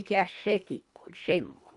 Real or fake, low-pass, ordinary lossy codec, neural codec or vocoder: fake; 10.8 kHz; none; codec, 24 kHz, 1.5 kbps, HILCodec